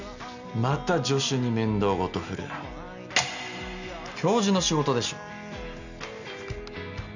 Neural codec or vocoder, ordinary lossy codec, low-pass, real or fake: none; none; 7.2 kHz; real